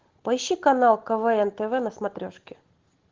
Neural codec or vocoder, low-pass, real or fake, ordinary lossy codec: none; 7.2 kHz; real; Opus, 16 kbps